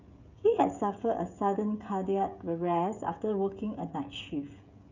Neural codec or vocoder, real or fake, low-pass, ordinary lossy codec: codec, 16 kHz, 16 kbps, FreqCodec, smaller model; fake; 7.2 kHz; none